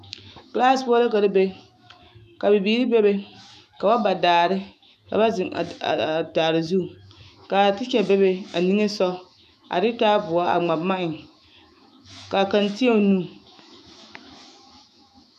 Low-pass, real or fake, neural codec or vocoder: 14.4 kHz; fake; autoencoder, 48 kHz, 128 numbers a frame, DAC-VAE, trained on Japanese speech